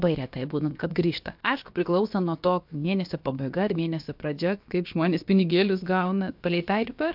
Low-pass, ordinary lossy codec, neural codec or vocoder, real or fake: 5.4 kHz; MP3, 48 kbps; codec, 16 kHz, about 1 kbps, DyCAST, with the encoder's durations; fake